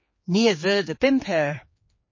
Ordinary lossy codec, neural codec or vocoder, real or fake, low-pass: MP3, 32 kbps; codec, 16 kHz, 4 kbps, X-Codec, HuBERT features, trained on general audio; fake; 7.2 kHz